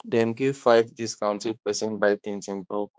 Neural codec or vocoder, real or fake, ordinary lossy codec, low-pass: codec, 16 kHz, 2 kbps, X-Codec, HuBERT features, trained on balanced general audio; fake; none; none